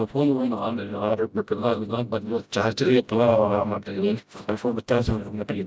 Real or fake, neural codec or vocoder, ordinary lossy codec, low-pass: fake; codec, 16 kHz, 0.5 kbps, FreqCodec, smaller model; none; none